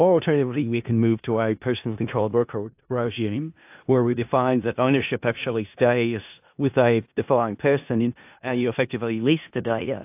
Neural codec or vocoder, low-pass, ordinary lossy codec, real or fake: codec, 16 kHz in and 24 kHz out, 0.4 kbps, LongCat-Audio-Codec, four codebook decoder; 3.6 kHz; AAC, 32 kbps; fake